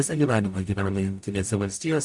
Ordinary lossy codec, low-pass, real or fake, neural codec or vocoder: MP3, 64 kbps; 10.8 kHz; fake; codec, 44.1 kHz, 0.9 kbps, DAC